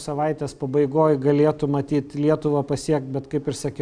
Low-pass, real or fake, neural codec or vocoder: 9.9 kHz; real; none